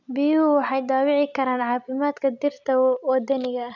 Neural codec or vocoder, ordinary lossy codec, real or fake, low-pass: none; none; real; 7.2 kHz